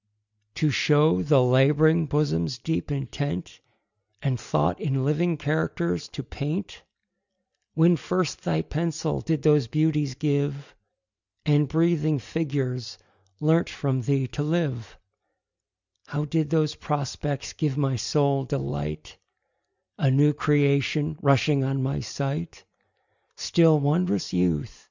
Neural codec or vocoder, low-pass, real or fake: none; 7.2 kHz; real